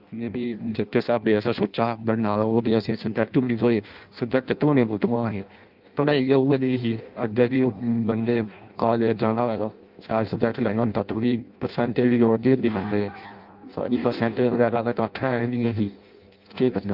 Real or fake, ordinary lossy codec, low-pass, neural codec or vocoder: fake; Opus, 24 kbps; 5.4 kHz; codec, 16 kHz in and 24 kHz out, 0.6 kbps, FireRedTTS-2 codec